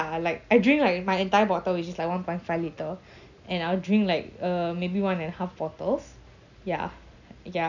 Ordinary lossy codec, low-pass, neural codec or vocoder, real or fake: none; 7.2 kHz; none; real